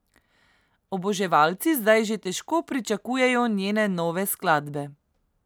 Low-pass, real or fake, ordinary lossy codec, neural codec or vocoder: none; real; none; none